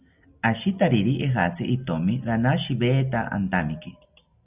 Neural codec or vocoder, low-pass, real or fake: none; 3.6 kHz; real